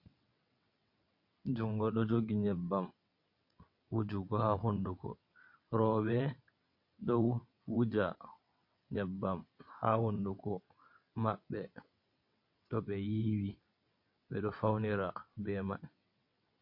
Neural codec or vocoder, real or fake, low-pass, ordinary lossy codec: vocoder, 22.05 kHz, 80 mel bands, WaveNeXt; fake; 5.4 kHz; MP3, 32 kbps